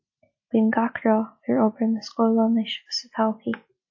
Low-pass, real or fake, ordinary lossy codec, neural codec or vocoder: 7.2 kHz; real; MP3, 32 kbps; none